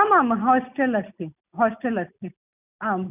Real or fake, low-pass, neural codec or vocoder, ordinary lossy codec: real; 3.6 kHz; none; none